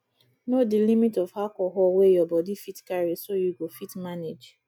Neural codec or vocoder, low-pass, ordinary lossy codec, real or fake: none; 19.8 kHz; none; real